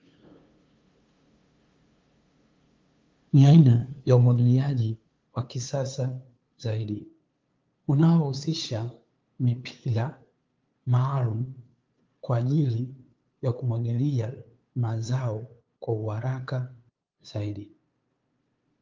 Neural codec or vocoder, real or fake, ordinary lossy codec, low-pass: codec, 16 kHz, 2 kbps, FunCodec, trained on LibriTTS, 25 frames a second; fake; Opus, 32 kbps; 7.2 kHz